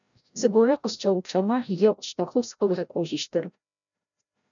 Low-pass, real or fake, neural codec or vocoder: 7.2 kHz; fake; codec, 16 kHz, 0.5 kbps, FreqCodec, larger model